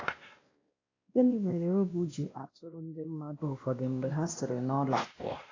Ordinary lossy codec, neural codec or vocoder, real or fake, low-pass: AAC, 32 kbps; codec, 16 kHz, 1 kbps, X-Codec, WavLM features, trained on Multilingual LibriSpeech; fake; 7.2 kHz